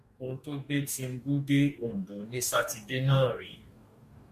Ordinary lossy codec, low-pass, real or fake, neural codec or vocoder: MP3, 64 kbps; 14.4 kHz; fake; codec, 44.1 kHz, 2.6 kbps, DAC